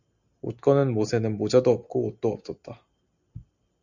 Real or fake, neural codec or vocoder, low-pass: real; none; 7.2 kHz